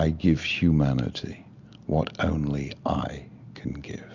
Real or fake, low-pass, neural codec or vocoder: real; 7.2 kHz; none